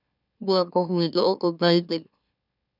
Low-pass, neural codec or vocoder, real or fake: 5.4 kHz; autoencoder, 44.1 kHz, a latent of 192 numbers a frame, MeloTTS; fake